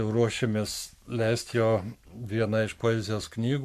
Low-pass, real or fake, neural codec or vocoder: 14.4 kHz; fake; codec, 44.1 kHz, 7.8 kbps, Pupu-Codec